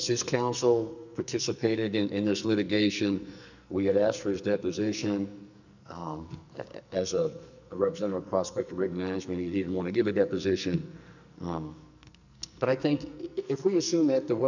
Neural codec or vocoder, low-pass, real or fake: codec, 44.1 kHz, 2.6 kbps, SNAC; 7.2 kHz; fake